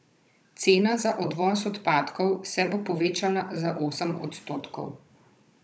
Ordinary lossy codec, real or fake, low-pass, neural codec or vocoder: none; fake; none; codec, 16 kHz, 16 kbps, FunCodec, trained on Chinese and English, 50 frames a second